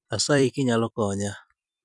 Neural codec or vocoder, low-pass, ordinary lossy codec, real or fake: vocoder, 44.1 kHz, 128 mel bands every 256 samples, BigVGAN v2; 10.8 kHz; none; fake